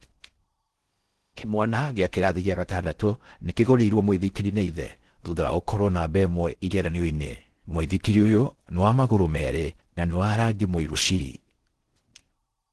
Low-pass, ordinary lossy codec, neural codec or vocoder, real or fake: 10.8 kHz; Opus, 16 kbps; codec, 16 kHz in and 24 kHz out, 0.6 kbps, FocalCodec, streaming, 4096 codes; fake